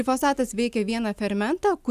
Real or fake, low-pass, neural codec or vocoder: real; 14.4 kHz; none